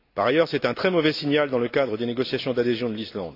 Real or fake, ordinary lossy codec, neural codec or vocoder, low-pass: real; AAC, 32 kbps; none; 5.4 kHz